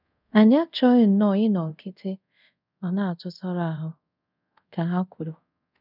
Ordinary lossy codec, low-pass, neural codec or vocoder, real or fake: none; 5.4 kHz; codec, 24 kHz, 0.5 kbps, DualCodec; fake